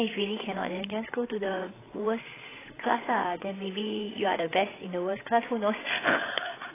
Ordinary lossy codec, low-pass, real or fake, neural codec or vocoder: AAC, 16 kbps; 3.6 kHz; fake; codec, 16 kHz, 8 kbps, FreqCodec, larger model